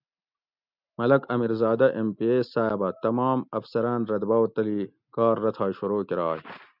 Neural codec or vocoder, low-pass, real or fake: none; 5.4 kHz; real